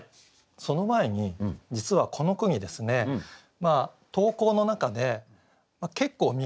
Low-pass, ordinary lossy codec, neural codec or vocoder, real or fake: none; none; none; real